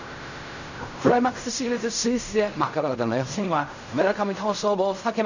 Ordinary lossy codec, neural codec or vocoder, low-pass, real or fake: none; codec, 16 kHz in and 24 kHz out, 0.4 kbps, LongCat-Audio-Codec, fine tuned four codebook decoder; 7.2 kHz; fake